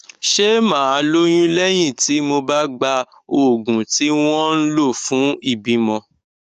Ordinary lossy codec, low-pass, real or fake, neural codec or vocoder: none; 14.4 kHz; fake; codec, 44.1 kHz, 7.8 kbps, DAC